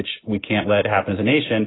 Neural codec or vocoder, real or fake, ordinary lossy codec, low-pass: none; real; AAC, 16 kbps; 7.2 kHz